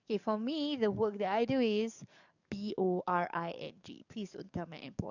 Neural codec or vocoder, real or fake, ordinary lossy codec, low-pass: codec, 44.1 kHz, 7.8 kbps, DAC; fake; none; 7.2 kHz